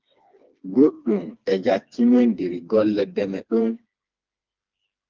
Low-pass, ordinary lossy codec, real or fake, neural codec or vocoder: 7.2 kHz; Opus, 32 kbps; fake; codec, 16 kHz, 2 kbps, FreqCodec, smaller model